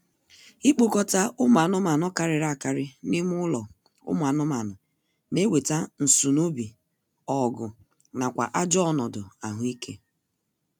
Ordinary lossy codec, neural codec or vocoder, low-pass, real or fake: none; none; none; real